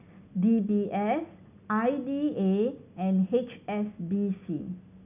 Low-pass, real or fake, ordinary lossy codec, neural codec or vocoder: 3.6 kHz; real; none; none